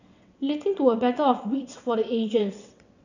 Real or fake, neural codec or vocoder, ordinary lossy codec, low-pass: fake; vocoder, 22.05 kHz, 80 mel bands, WaveNeXt; none; 7.2 kHz